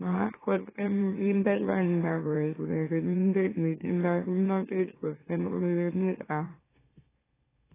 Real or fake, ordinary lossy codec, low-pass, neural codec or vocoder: fake; AAC, 16 kbps; 3.6 kHz; autoencoder, 44.1 kHz, a latent of 192 numbers a frame, MeloTTS